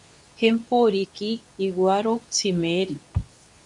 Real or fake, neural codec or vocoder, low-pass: fake; codec, 24 kHz, 0.9 kbps, WavTokenizer, medium speech release version 2; 10.8 kHz